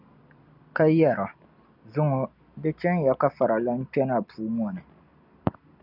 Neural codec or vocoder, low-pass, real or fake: none; 5.4 kHz; real